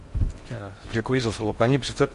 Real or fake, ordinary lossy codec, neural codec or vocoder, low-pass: fake; MP3, 48 kbps; codec, 16 kHz in and 24 kHz out, 0.6 kbps, FocalCodec, streaming, 4096 codes; 10.8 kHz